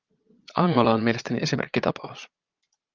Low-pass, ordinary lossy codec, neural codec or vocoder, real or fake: 7.2 kHz; Opus, 32 kbps; vocoder, 22.05 kHz, 80 mel bands, Vocos; fake